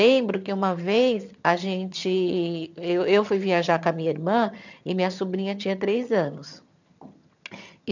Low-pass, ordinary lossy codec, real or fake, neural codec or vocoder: 7.2 kHz; none; fake; vocoder, 22.05 kHz, 80 mel bands, HiFi-GAN